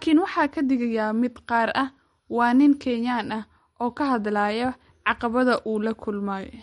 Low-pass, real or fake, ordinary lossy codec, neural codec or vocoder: 19.8 kHz; real; MP3, 48 kbps; none